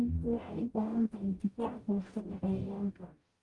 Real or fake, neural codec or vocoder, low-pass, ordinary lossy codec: fake; codec, 44.1 kHz, 0.9 kbps, DAC; 10.8 kHz; Opus, 24 kbps